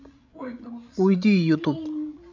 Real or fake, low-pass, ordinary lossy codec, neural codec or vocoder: fake; 7.2 kHz; MP3, 64 kbps; codec, 16 kHz, 16 kbps, FreqCodec, larger model